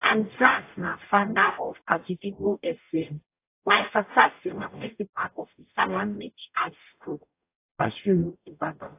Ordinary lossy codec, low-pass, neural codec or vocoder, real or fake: AAC, 24 kbps; 3.6 kHz; codec, 44.1 kHz, 0.9 kbps, DAC; fake